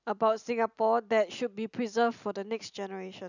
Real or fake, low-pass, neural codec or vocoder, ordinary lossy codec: real; 7.2 kHz; none; none